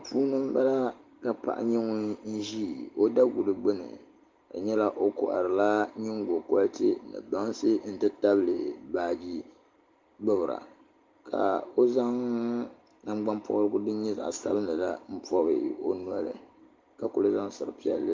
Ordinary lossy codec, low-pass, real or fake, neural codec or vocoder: Opus, 32 kbps; 7.2 kHz; real; none